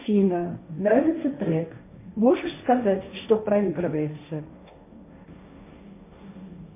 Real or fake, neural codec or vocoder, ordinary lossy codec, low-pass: fake; codec, 16 kHz, 1.1 kbps, Voila-Tokenizer; MP3, 24 kbps; 3.6 kHz